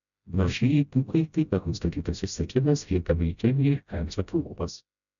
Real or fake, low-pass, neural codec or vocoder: fake; 7.2 kHz; codec, 16 kHz, 0.5 kbps, FreqCodec, smaller model